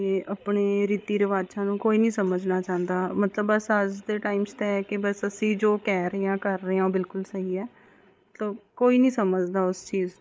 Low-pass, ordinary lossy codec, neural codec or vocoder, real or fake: none; none; codec, 16 kHz, 8 kbps, FreqCodec, larger model; fake